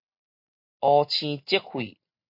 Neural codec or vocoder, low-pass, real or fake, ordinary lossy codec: none; 5.4 kHz; real; MP3, 32 kbps